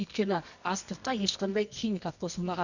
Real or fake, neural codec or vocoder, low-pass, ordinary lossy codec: fake; codec, 24 kHz, 0.9 kbps, WavTokenizer, medium music audio release; 7.2 kHz; none